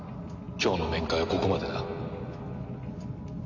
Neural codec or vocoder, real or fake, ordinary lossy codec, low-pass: none; real; none; 7.2 kHz